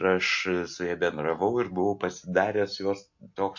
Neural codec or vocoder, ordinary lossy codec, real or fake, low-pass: none; MP3, 48 kbps; real; 7.2 kHz